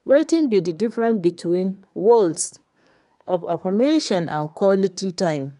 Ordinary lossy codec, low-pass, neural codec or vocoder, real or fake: none; 10.8 kHz; codec, 24 kHz, 1 kbps, SNAC; fake